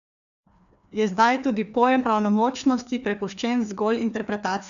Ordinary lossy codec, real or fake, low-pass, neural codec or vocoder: none; fake; 7.2 kHz; codec, 16 kHz, 2 kbps, FreqCodec, larger model